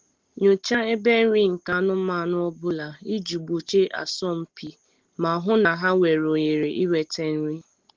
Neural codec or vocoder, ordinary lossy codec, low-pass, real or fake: none; Opus, 16 kbps; 7.2 kHz; real